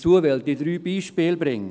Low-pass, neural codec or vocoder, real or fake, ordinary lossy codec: none; none; real; none